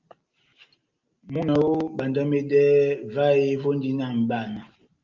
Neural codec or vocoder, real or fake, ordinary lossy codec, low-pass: none; real; Opus, 32 kbps; 7.2 kHz